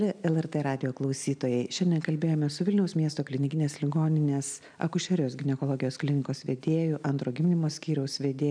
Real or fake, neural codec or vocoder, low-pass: real; none; 9.9 kHz